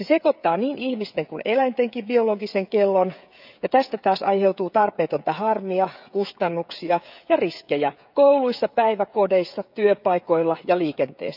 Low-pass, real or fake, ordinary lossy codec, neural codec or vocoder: 5.4 kHz; fake; none; codec, 16 kHz, 16 kbps, FreqCodec, smaller model